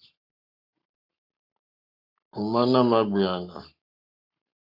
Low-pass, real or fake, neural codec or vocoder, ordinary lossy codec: 5.4 kHz; fake; codec, 44.1 kHz, 7.8 kbps, Pupu-Codec; MP3, 48 kbps